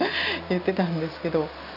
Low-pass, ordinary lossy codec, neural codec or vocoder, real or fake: 5.4 kHz; none; none; real